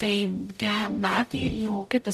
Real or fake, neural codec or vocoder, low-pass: fake; codec, 44.1 kHz, 0.9 kbps, DAC; 14.4 kHz